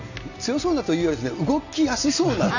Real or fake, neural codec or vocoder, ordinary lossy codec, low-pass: real; none; none; 7.2 kHz